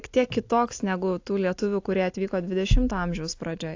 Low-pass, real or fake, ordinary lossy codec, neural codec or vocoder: 7.2 kHz; real; AAC, 48 kbps; none